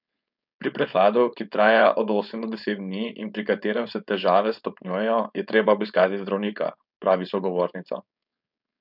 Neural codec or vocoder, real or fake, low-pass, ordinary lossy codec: codec, 16 kHz, 4.8 kbps, FACodec; fake; 5.4 kHz; none